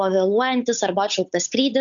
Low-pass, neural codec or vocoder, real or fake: 7.2 kHz; codec, 16 kHz, 8 kbps, FunCodec, trained on Chinese and English, 25 frames a second; fake